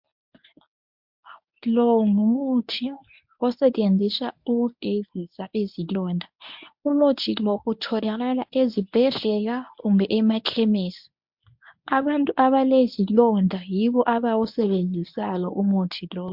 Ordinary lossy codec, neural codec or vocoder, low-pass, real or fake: MP3, 48 kbps; codec, 24 kHz, 0.9 kbps, WavTokenizer, medium speech release version 1; 5.4 kHz; fake